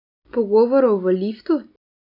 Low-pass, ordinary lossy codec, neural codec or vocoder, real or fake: 5.4 kHz; none; none; real